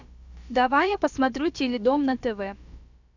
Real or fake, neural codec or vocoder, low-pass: fake; codec, 16 kHz, about 1 kbps, DyCAST, with the encoder's durations; 7.2 kHz